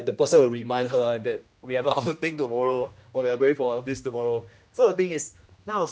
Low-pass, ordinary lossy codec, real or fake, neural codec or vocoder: none; none; fake; codec, 16 kHz, 1 kbps, X-Codec, HuBERT features, trained on general audio